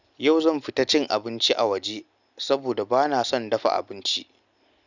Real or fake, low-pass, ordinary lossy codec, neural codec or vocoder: real; 7.2 kHz; none; none